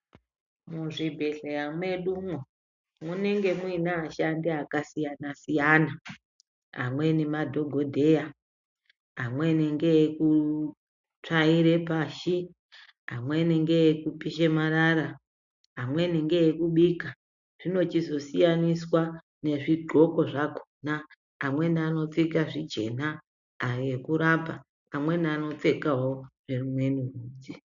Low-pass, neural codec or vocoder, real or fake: 7.2 kHz; none; real